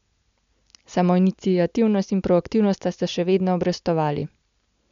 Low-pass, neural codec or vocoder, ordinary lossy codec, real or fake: 7.2 kHz; none; MP3, 64 kbps; real